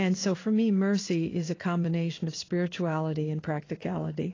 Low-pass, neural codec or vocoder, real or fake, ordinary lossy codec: 7.2 kHz; codec, 16 kHz in and 24 kHz out, 1 kbps, XY-Tokenizer; fake; AAC, 32 kbps